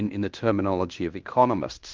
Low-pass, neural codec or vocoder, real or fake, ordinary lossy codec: 7.2 kHz; codec, 16 kHz in and 24 kHz out, 0.9 kbps, LongCat-Audio-Codec, fine tuned four codebook decoder; fake; Opus, 32 kbps